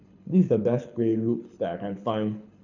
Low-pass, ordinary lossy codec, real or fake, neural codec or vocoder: 7.2 kHz; none; fake; codec, 24 kHz, 6 kbps, HILCodec